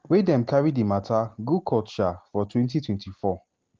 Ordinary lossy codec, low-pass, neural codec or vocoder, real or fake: Opus, 16 kbps; 7.2 kHz; none; real